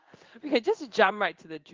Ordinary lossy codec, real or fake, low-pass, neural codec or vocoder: Opus, 32 kbps; fake; 7.2 kHz; codec, 16 kHz in and 24 kHz out, 1 kbps, XY-Tokenizer